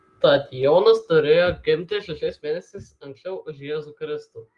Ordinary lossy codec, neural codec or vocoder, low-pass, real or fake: Opus, 24 kbps; none; 10.8 kHz; real